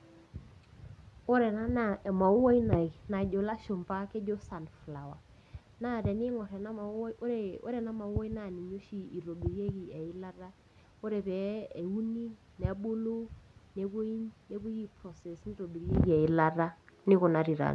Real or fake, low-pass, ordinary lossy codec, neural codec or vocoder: real; none; none; none